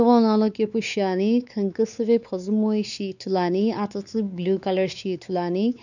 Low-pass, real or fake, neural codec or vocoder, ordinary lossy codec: 7.2 kHz; fake; codec, 16 kHz, 4 kbps, X-Codec, WavLM features, trained on Multilingual LibriSpeech; none